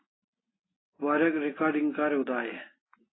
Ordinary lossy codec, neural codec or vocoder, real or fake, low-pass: AAC, 16 kbps; none; real; 7.2 kHz